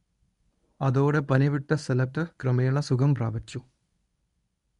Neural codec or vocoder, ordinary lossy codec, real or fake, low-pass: codec, 24 kHz, 0.9 kbps, WavTokenizer, medium speech release version 1; none; fake; 10.8 kHz